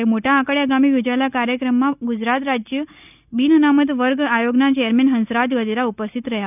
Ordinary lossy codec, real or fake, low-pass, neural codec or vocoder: none; real; 3.6 kHz; none